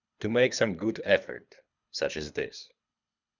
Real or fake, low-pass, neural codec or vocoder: fake; 7.2 kHz; codec, 24 kHz, 3 kbps, HILCodec